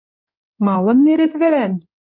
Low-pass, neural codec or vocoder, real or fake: 5.4 kHz; codec, 16 kHz in and 24 kHz out, 2.2 kbps, FireRedTTS-2 codec; fake